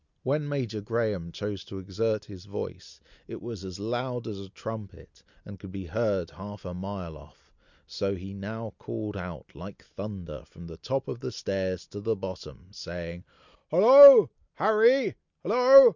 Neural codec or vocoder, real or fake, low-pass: none; real; 7.2 kHz